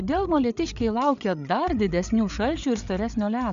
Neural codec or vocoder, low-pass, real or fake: codec, 16 kHz, 8 kbps, FreqCodec, larger model; 7.2 kHz; fake